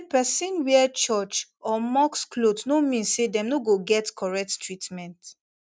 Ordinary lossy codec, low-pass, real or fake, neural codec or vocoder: none; none; real; none